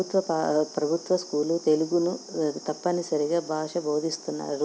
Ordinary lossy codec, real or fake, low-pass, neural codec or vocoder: none; real; none; none